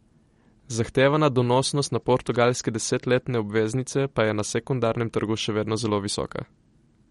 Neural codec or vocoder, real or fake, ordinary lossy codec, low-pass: none; real; MP3, 48 kbps; 19.8 kHz